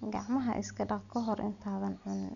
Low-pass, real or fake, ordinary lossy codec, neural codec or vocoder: 7.2 kHz; real; none; none